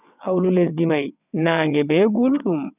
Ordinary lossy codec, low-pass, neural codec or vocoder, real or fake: none; 3.6 kHz; vocoder, 22.05 kHz, 80 mel bands, WaveNeXt; fake